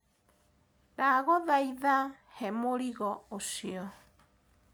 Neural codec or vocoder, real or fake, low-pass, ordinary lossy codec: none; real; none; none